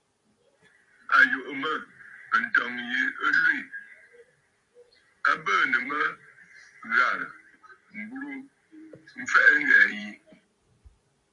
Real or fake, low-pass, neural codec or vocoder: fake; 10.8 kHz; vocoder, 44.1 kHz, 128 mel bands every 256 samples, BigVGAN v2